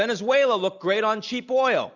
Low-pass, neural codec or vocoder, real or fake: 7.2 kHz; none; real